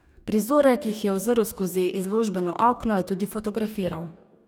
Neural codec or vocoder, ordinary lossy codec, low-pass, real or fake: codec, 44.1 kHz, 2.6 kbps, DAC; none; none; fake